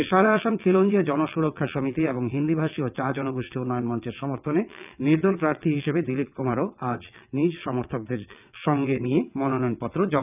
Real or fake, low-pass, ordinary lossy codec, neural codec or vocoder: fake; 3.6 kHz; none; vocoder, 22.05 kHz, 80 mel bands, WaveNeXt